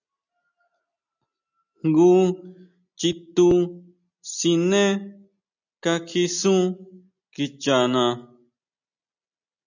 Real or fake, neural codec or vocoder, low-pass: real; none; 7.2 kHz